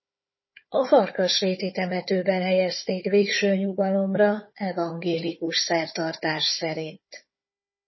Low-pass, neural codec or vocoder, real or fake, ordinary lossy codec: 7.2 kHz; codec, 16 kHz, 4 kbps, FunCodec, trained on Chinese and English, 50 frames a second; fake; MP3, 24 kbps